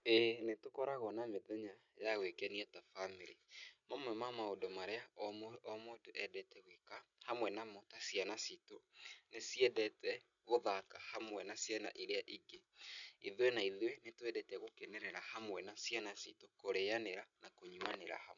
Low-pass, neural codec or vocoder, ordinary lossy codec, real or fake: 7.2 kHz; none; none; real